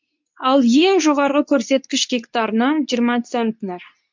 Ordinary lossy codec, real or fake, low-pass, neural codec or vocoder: MP3, 64 kbps; fake; 7.2 kHz; codec, 24 kHz, 0.9 kbps, WavTokenizer, medium speech release version 2